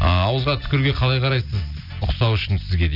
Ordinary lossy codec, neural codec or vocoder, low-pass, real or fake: none; none; 5.4 kHz; real